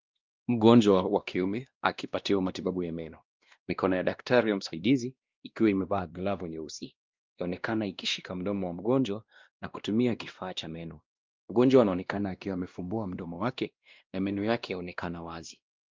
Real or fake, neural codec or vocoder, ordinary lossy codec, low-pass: fake; codec, 16 kHz, 1 kbps, X-Codec, WavLM features, trained on Multilingual LibriSpeech; Opus, 32 kbps; 7.2 kHz